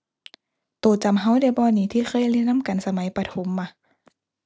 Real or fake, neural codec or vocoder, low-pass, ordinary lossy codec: real; none; none; none